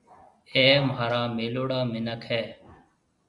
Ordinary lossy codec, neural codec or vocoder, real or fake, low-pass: Opus, 64 kbps; none; real; 10.8 kHz